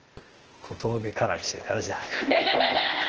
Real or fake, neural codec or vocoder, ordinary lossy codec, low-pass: fake; codec, 16 kHz, 0.8 kbps, ZipCodec; Opus, 16 kbps; 7.2 kHz